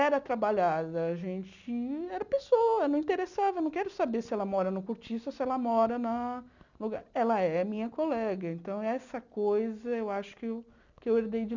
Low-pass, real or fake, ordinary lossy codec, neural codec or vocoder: 7.2 kHz; real; none; none